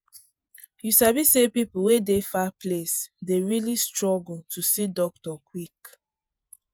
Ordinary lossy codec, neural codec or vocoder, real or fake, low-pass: none; vocoder, 48 kHz, 128 mel bands, Vocos; fake; none